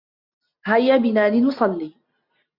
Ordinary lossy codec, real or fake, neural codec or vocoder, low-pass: MP3, 48 kbps; real; none; 5.4 kHz